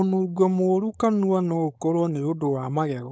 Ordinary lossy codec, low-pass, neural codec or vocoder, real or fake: none; none; codec, 16 kHz, 4.8 kbps, FACodec; fake